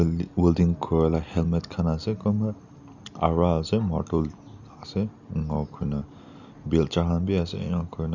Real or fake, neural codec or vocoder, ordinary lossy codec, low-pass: real; none; none; 7.2 kHz